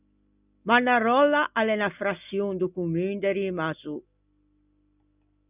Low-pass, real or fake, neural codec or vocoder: 3.6 kHz; real; none